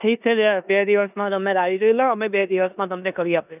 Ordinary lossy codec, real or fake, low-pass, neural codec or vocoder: none; fake; 3.6 kHz; codec, 16 kHz in and 24 kHz out, 0.9 kbps, LongCat-Audio-Codec, four codebook decoder